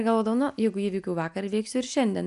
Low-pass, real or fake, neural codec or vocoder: 10.8 kHz; real; none